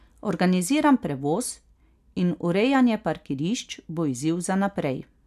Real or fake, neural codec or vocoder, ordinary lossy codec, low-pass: real; none; none; 14.4 kHz